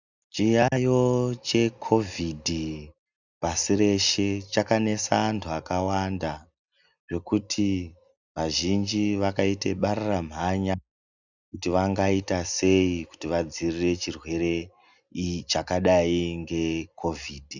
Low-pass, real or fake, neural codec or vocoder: 7.2 kHz; real; none